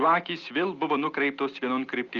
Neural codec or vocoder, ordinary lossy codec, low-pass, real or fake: none; Opus, 24 kbps; 7.2 kHz; real